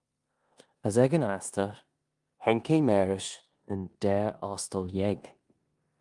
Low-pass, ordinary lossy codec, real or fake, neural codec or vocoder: 10.8 kHz; Opus, 32 kbps; fake; codec, 16 kHz in and 24 kHz out, 0.9 kbps, LongCat-Audio-Codec, four codebook decoder